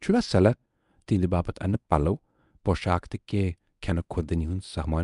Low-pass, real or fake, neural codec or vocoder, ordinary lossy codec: 10.8 kHz; fake; codec, 24 kHz, 0.9 kbps, WavTokenizer, medium speech release version 1; none